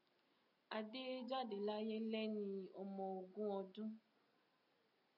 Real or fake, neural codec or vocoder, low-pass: real; none; 5.4 kHz